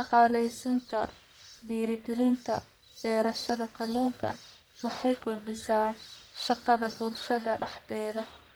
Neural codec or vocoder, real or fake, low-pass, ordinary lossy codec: codec, 44.1 kHz, 1.7 kbps, Pupu-Codec; fake; none; none